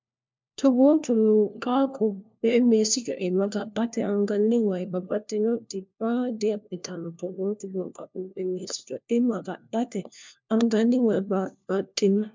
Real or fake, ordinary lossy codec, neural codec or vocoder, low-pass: fake; MP3, 64 kbps; codec, 16 kHz, 1 kbps, FunCodec, trained on LibriTTS, 50 frames a second; 7.2 kHz